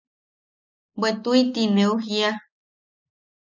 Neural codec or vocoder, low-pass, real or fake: none; 7.2 kHz; real